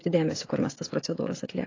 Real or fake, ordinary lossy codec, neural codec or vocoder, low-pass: fake; AAC, 32 kbps; codec, 16 kHz, 16 kbps, FreqCodec, smaller model; 7.2 kHz